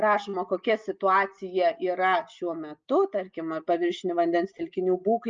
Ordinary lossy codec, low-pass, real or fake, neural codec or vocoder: Opus, 24 kbps; 7.2 kHz; real; none